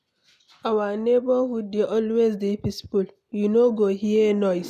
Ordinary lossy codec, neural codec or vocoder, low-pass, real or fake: none; none; 14.4 kHz; real